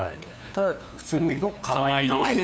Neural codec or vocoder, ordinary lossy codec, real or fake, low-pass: codec, 16 kHz, 2 kbps, FunCodec, trained on LibriTTS, 25 frames a second; none; fake; none